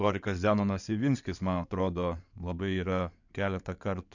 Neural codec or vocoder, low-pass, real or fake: codec, 16 kHz in and 24 kHz out, 2.2 kbps, FireRedTTS-2 codec; 7.2 kHz; fake